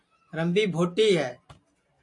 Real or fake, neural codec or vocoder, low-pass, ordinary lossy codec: real; none; 10.8 kHz; MP3, 48 kbps